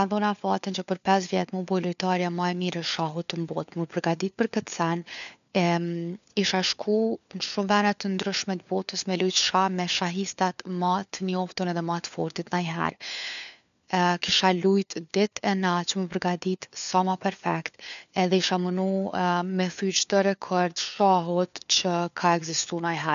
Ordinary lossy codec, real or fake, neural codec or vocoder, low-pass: none; fake; codec, 16 kHz, 4 kbps, FunCodec, trained on LibriTTS, 50 frames a second; 7.2 kHz